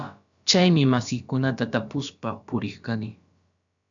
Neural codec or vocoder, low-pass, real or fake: codec, 16 kHz, about 1 kbps, DyCAST, with the encoder's durations; 7.2 kHz; fake